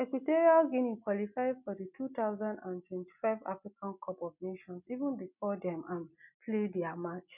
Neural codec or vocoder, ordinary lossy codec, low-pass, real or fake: none; none; 3.6 kHz; real